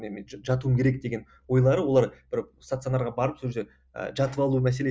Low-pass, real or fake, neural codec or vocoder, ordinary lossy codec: none; real; none; none